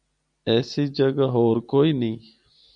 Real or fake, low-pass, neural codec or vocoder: real; 9.9 kHz; none